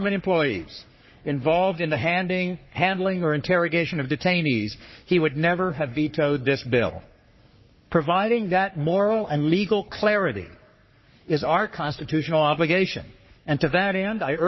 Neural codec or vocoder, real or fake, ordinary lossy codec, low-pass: codec, 44.1 kHz, 3.4 kbps, Pupu-Codec; fake; MP3, 24 kbps; 7.2 kHz